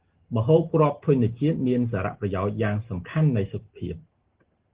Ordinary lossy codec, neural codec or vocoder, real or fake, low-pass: Opus, 16 kbps; none; real; 3.6 kHz